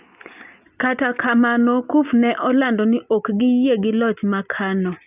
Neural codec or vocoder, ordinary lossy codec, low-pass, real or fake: none; none; 3.6 kHz; real